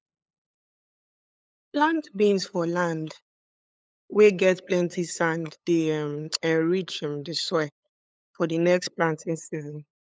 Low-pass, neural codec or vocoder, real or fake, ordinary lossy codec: none; codec, 16 kHz, 8 kbps, FunCodec, trained on LibriTTS, 25 frames a second; fake; none